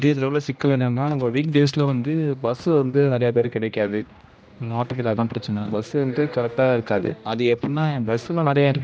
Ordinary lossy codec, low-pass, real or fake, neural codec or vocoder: none; none; fake; codec, 16 kHz, 1 kbps, X-Codec, HuBERT features, trained on general audio